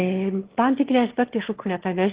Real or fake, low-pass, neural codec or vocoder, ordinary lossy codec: fake; 3.6 kHz; autoencoder, 22.05 kHz, a latent of 192 numbers a frame, VITS, trained on one speaker; Opus, 16 kbps